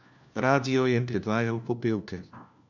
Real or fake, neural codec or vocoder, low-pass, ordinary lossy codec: fake; codec, 16 kHz, 1 kbps, FunCodec, trained on LibriTTS, 50 frames a second; 7.2 kHz; none